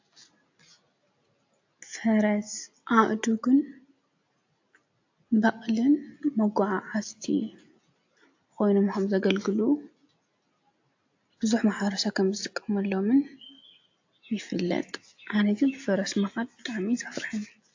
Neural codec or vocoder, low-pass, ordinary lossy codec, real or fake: none; 7.2 kHz; AAC, 48 kbps; real